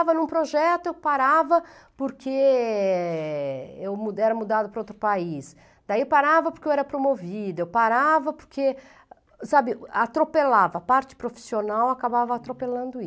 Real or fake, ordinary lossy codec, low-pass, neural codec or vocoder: real; none; none; none